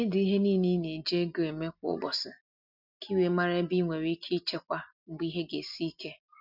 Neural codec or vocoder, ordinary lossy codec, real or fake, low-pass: none; none; real; 5.4 kHz